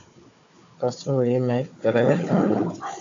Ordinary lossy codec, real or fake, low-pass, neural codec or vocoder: AAC, 48 kbps; fake; 7.2 kHz; codec, 16 kHz, 4 kbps, FunCodec, trained on Chinese and English, 50 frames a second